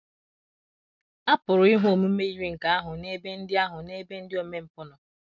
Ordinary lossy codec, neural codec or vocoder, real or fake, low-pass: none; none; real; 7.2 kHz